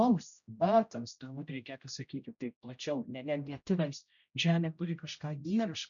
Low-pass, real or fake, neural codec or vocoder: 7.2 kHz; fake; codec, 16 kHz, 0.5 kbps, X-Codec, HuBERT features, trained on general audio